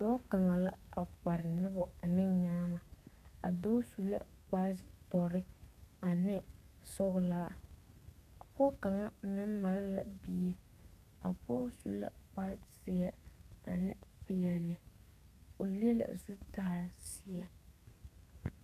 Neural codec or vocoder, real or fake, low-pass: codec, 32 kHz, 1.9 kbps, SNAC; fake; 14.4 kHz